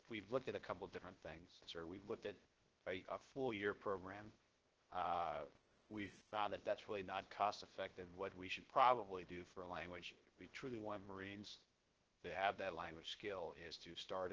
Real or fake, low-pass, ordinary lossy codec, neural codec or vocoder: fake; 7.2 kHz; Opus, 16 kbps; codec, 16 kHz, 0.7 kbps, FocalCodec